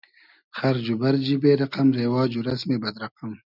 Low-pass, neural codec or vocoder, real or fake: 5.4 kHz; none; real